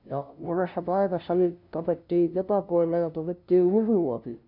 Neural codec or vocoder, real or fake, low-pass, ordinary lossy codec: codec, 16 kHz, 0.5 kbps, FunCodec, trained on LibriTTS, 25 frames a second; fake; 5.4 kHz; none